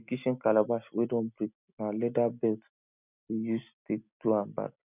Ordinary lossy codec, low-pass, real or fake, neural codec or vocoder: none; 3.6 kHz; real; none